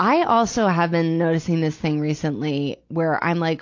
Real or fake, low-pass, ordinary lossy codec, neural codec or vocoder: real; 7.2 kHz; AAC, 48 kbps; none